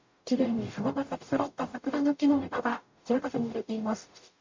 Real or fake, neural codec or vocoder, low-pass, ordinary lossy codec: fake; codec, 44.1 kHz, 0.9 kbps, DAC; 7.2 kHz; MP3, 64 kbps